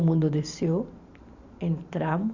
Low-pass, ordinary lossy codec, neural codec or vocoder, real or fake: 7.2 kHz; Opus, 64 kbps; vocoder, 44.1 kHz, 128 mel bands every 512 samples, BigVGAN v2; fake